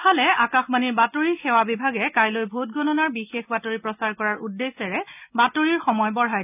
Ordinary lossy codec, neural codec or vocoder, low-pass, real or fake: none; none; 3.6 kHz; real